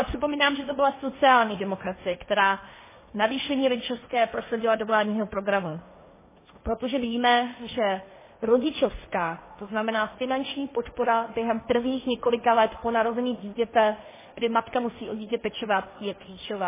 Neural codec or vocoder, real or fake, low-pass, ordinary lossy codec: codec, 16 kHz, 1.1 kbps, Voila-Tokenizer; fake; 3.6 kHz; MP3, 16 kbps